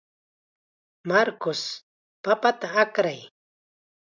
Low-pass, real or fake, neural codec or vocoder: 7.2 kHz; real; none